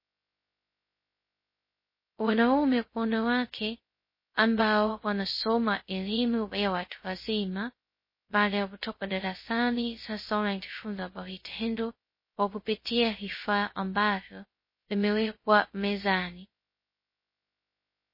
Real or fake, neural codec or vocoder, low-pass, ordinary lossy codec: fake; codec, 16 kHz, 0.2 kbps, FocalCodec; 5.4 kHz; MP3, 24 kbps